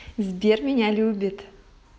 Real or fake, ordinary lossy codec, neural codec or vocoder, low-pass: real; none; none; none